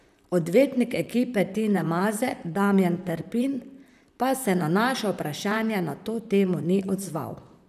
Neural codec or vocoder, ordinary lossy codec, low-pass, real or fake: vocoder, 44.1 kHz, 128 mel bands, Pupu-Vocoder; none; 14.4 kHz; fake